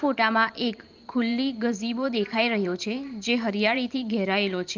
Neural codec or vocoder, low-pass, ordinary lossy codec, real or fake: vocoder, 44.1 kHz, 128 mel bands every 512 samples, BigVGAN v2; 7.2 kHz; Opus, 32 kbps; fake